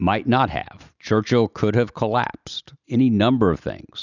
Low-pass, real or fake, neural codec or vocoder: 7.2 kHz; real; none